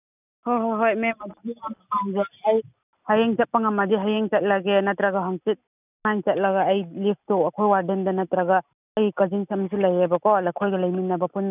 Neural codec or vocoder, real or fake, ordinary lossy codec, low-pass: none; real; none; 3.6 kHz